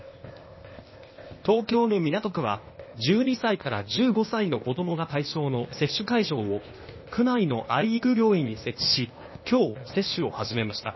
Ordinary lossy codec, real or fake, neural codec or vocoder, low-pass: MP3, 24 kbps; fake; codec, 16 kHz, 0.8 kbps, ZipCodec; 7.2 kHz